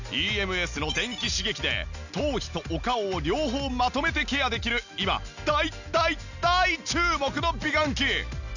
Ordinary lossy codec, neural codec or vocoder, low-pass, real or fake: none; none; 7.2 kHz; real